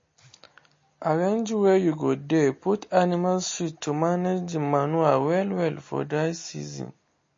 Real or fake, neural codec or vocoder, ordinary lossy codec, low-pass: real; none; MP3, 32 kbps; 7.2 kHz